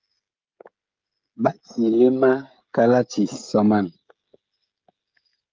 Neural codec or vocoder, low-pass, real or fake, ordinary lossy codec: codec, 16 kHz, 16 kbps, FreqCodec, smaller model; 7.2 kHz; fake; Opus, 32 kbps